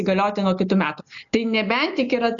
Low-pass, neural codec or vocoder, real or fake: 7.2 kHz; none; real